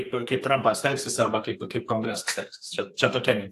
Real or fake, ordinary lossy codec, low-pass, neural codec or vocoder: fake; AAC, 64 kbps; 14.4 kHz; codec, 32 kHz, 1.9 kbps, SNAC